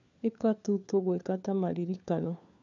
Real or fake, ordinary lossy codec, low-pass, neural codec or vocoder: fake; AAC, 64 kbps; 7.2 kHz; codec, 16 kHz, 4 kbps, FunCodec, trained on LibriTTS, 50 frames a second